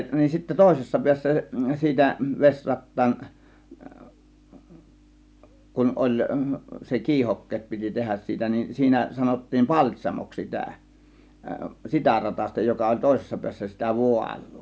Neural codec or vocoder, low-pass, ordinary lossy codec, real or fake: none; none; none; real